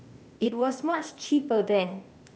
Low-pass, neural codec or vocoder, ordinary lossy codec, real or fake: none; codec, 16 kHz, 0.8 kbps, ZipCodec; none; fake